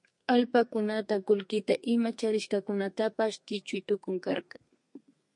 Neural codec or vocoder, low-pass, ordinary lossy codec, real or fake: codec, 32 kHz, 1.9 kbps, SNAC; 10.8 kHz; MP3, 48 kbps; fake